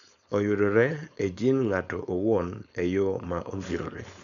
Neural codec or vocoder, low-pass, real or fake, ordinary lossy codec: codec, 16 kHz, 4.8 kbps, FACodec; 7.2 kHz; fake; none